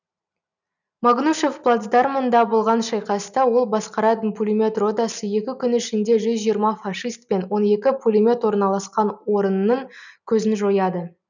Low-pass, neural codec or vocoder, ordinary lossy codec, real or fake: 7.2 kHz; none; none; real